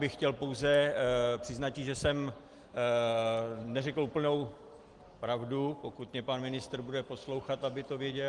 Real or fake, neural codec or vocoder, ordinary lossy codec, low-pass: real; none; Opus, 24 kbps; 10.8 kHz